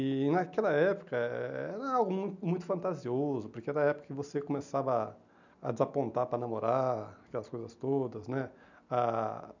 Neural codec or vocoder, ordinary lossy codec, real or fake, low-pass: none; none; real; 7.2 kHz